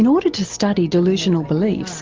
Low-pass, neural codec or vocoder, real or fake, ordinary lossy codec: 7.2 kHz; none; real; Opus, 24 kbps